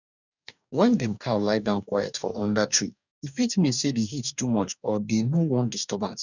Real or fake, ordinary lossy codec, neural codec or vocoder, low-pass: fake; none; codec, 44.1 kHz, 2.6 kbps, DAC; 7.2 kHz